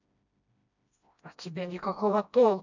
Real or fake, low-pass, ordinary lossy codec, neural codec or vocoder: fake; 7.2 kHz; none; codec, 16 kHz, 1 kbps, FreqCodec, smaller model